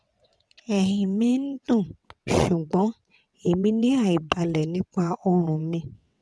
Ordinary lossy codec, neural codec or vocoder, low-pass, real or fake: none; vocoder, 22.05 kHz, 80 mel bands, WaveNeXt; none; fake